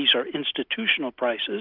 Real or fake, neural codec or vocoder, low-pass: real; none; 5.4 kHz